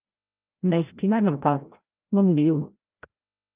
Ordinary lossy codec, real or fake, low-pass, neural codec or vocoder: Opus, 32 kbps; fake; 3.6 kHz; codec, 16 kHz, 0.5 kbps, FreqCodec, larger model